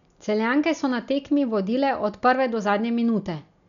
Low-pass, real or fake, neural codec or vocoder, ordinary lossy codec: 7.2 kHz; real; none; none